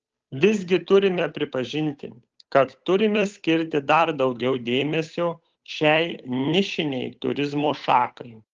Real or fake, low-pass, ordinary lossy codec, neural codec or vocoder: fake; 7.2 kHz; Opus, 24 kbps; codec, 16 kHz, 8 kbps, FunCodec, trained on Chinese and English, 25 frames a second